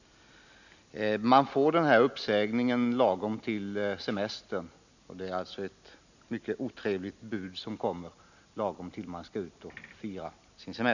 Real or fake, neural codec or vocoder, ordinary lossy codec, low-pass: real; none; none; 7.2 kHz